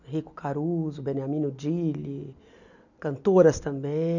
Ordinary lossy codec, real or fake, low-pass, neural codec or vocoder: none; real; 7.2 kHz; none